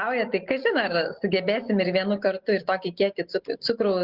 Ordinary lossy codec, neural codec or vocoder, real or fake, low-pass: Opus, 16 kbps; none; real; 5.4 kHz